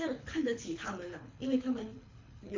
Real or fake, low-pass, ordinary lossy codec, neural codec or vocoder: fake; 7.2 kHz; MP3, 64 kbps; codec, 24 kHz, 6 kbps, HILCodec